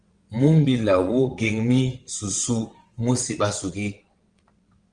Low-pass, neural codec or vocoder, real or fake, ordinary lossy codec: 9.9 kHz; vocoder, 22.05 kHz, 80 mel bands, WaveNeXt; fake; Opus, 24 kbps